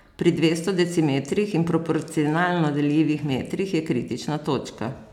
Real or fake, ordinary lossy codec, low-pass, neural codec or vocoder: real; none; 19.8 kHz; none